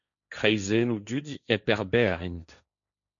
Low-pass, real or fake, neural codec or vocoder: 7.2 kHz; fake; codec, 16 kHz, 1.1 kbps, Voila-Tokenizer